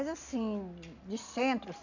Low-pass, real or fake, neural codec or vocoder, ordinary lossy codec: 7.2 kHz; real; none; none